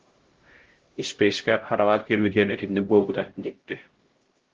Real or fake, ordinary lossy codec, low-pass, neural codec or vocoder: fake; Opus, 16 kbps; 7.2 kHz; codec, 16 kHz, 0.5 kbps, X-Codec, HuBERT features, trained on LibriSpeech